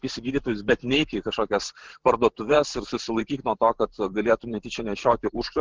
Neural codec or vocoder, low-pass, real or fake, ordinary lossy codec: vocoder, 44.1 kHz, 128 mel bands every 512 samples, BigVGAN v2; 7.2 kHz; fake; Opus, 16 kbps